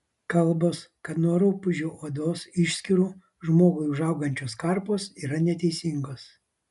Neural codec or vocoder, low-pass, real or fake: none; 10.8 kHz; real